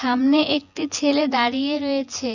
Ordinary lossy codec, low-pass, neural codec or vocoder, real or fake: none; 7.2 kHz; vocoder, 24 kHz, 100 mel bands, Vocos; fake